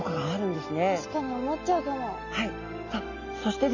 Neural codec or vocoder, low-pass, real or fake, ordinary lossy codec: none; 7.2 kHz; real; none